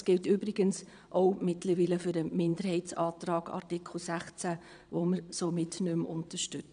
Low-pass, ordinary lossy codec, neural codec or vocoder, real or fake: 9.9 kHz; none; vocoder, 22.05 kHz, 80 mel bands, Vocos; fake